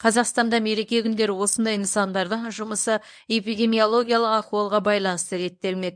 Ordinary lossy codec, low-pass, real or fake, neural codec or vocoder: MP3, 96 kbps; 9.9 kHz; fake; codec, 24 kHz, 0.9 kbps, WavTokenizer, medium speech release version 1